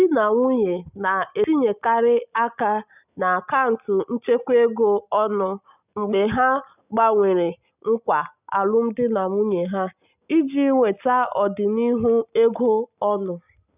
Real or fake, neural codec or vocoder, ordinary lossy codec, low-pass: real; none; none; 3.6 kHz